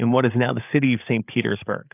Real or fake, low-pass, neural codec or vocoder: fake; 3.6 kHz; codec, 16 kHz, 8 kbps, FreqCodec, larger model